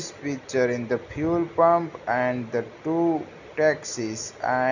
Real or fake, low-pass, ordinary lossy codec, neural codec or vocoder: real; 7.2 kHz; none; none